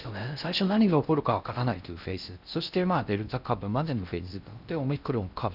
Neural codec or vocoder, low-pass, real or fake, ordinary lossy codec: codec, 16 kHz in and 24 kHz out, 0.6 kbps, FocalCodec, streaming, 4096 codes; 5.4 kHz; fake; none